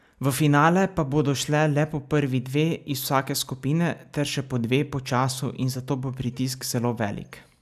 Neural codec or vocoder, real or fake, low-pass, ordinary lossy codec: none; real; 14.4 kHz; none